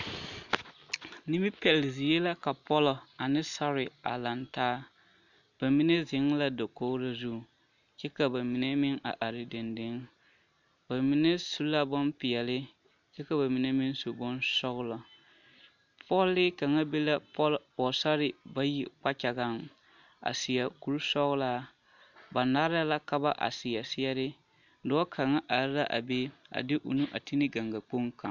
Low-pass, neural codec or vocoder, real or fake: 7.2 kHz; none; real